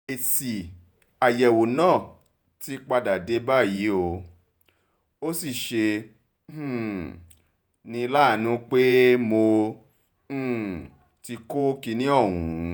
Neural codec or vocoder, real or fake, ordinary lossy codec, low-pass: vocoder, 48 kHz, 128 mel bands, Vocos; fake; none; none